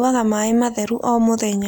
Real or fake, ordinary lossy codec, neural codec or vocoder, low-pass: real; none; none; none